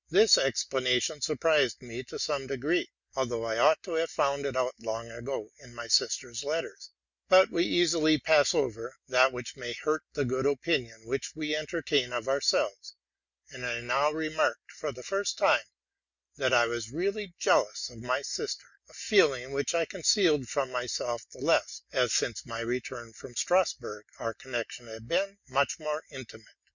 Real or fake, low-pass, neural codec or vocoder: real; 7.2 kHz; none